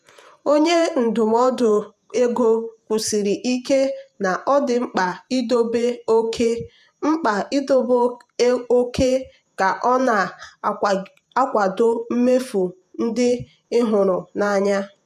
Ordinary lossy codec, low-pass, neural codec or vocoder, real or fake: none; 14.4 kHz; vocoder, 48 kHz, 128 mel bands, Vocos; fake